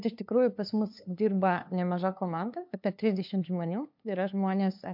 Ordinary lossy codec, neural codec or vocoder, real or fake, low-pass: MP3, 48 kbps; codec, 16 kHz, 2 kbps, FunCodec, trained on LibriTTS, 25 frames a second; fake; 5.4 kHz